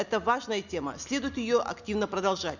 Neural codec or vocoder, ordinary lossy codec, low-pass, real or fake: none; none; 7.2 kHz; real